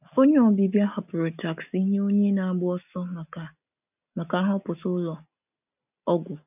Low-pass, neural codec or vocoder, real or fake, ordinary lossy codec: 3.6 kHz; none; real; none